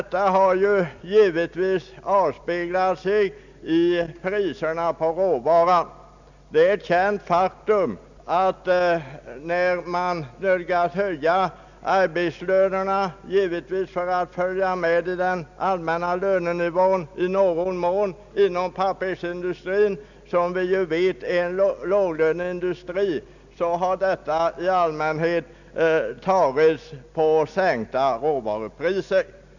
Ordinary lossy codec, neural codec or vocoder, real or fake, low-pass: none; none; real; 7.2 kHz